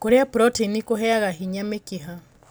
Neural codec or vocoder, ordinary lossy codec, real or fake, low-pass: none; none; real; none